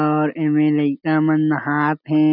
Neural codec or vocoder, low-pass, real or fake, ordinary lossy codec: none; 5.4 kHz; real; none